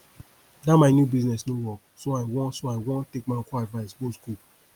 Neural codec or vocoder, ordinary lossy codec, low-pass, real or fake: none; Opus, 32 kbps; 19.8 kHz; real